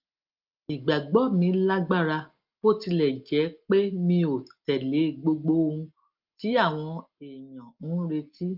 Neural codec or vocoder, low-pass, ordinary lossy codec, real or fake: none; 5.4 kHz; Opus, 24 kbps; real